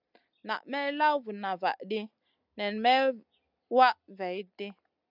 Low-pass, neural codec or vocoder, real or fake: 5.4 kHz; none; real